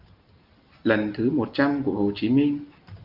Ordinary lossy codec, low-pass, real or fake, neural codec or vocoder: Opus, 32 kbps; 5.4 kHz; real; none